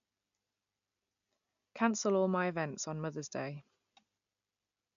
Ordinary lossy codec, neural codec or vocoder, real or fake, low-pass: none; none; real; 7.2 kHz